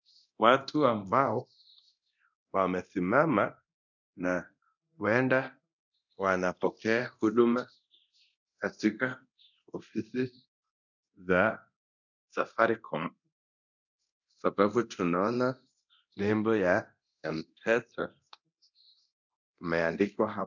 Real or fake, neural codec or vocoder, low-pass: fake; codec, 24 kHz, 0.9 kbps, DualCodec; 7.2 kHz